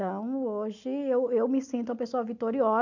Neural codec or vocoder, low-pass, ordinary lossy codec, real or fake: none; 7.2 kHz; none; real